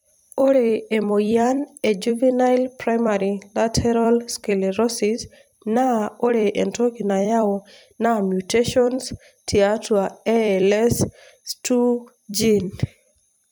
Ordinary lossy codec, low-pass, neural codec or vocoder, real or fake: none; none; vocoder, 44.1 kHz, 128 mel bands every 256 samples, BigVGAN v2; fake